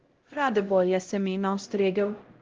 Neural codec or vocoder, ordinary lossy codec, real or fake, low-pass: codec, 16 kHz, 0.5 kbps, X-Codec, HuBERT features, trained on LibriSpeech; Opus, 16 kbps; fake; 7.2 kHz